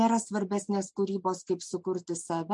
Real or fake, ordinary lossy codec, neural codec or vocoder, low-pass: real; MP3, 64 kbps; none; 10.8 kHz